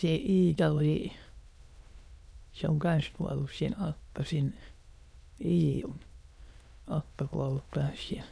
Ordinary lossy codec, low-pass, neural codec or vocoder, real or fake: none; none; autoencoder, 22.05 kHz, a latent of 192 numbers a frame, VITS, trained on many speakers; fake